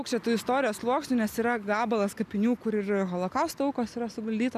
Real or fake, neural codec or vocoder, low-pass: real; none; 14.4 kHz